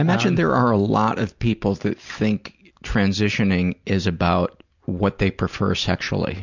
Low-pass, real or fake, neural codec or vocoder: 7.2 kHz; real; none